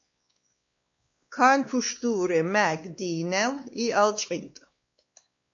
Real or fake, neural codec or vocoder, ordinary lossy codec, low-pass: fake; codec, 16 kHz, 2 kbps, X-Codec, WavLM features, trained on Multilingual LibriSpeech; MP3, 48 kbps; 7.2 kHz